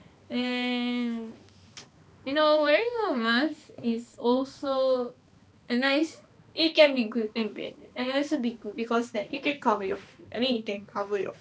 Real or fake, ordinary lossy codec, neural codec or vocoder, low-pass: fake; none; codec, 16 kHz, 2 kbps, X-Codec, HuBERT features, trained on balanced general audio; none